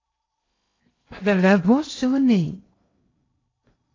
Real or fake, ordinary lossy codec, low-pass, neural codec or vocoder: fake; AAC, 32 kbps; 7.2 kHz; codec, 16 kHz in and 24 kHz out, 0.8 kbps, FocalCodec, streaming, 65536 codes